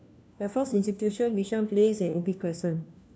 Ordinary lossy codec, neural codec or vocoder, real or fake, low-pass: none; codec, 16 kHz, 1 kbps, FunCodec, trained on LibriTTS, 50 frames a second; fake; none